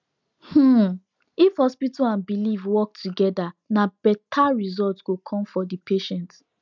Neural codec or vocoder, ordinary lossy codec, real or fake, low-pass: none; none; real; 7.2 kHz